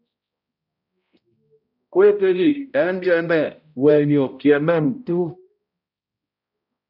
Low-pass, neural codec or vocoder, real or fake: 5.4 kHz; codec, 16 kHz, 0.5 kbps, X-Codec, HuBERT features, trained on balanced general audio; fake